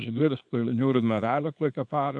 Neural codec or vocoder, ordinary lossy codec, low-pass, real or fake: codec, 24 kHz, 0.9 kbps, WavTokenizer, small release; MP3, 64 kbps; 9.9 kHz; fake